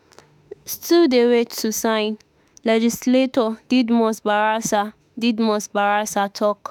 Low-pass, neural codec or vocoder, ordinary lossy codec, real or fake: none; autoencoder, 48 kHz, 32 numbers a frame, DAC-VAE, trained on Japanese speech; none; fake